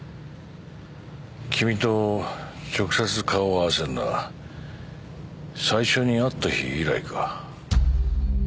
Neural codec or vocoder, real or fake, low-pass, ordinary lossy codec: none; real; none; none